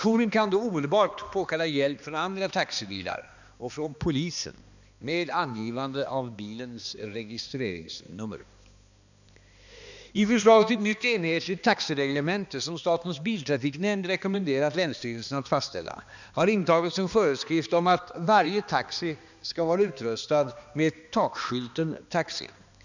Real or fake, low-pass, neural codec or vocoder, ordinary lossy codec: fake; 7.2 kHz; codec, 16 kHz, 2 kbps, X-Codec, HuBERT features, trained on balanced general audio; none